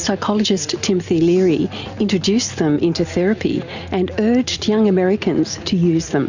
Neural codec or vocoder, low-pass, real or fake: none; 7.2 kHz; real